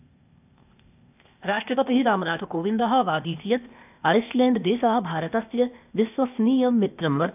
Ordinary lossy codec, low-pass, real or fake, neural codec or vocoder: none; 3.6 kHz; fake; codec, 16 kHz, 0.8 kbps, ZipCodec